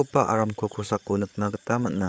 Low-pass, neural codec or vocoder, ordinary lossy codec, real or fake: none; codec, 16 kHz, 8 kbps, FreqCodec, larger model; none; fake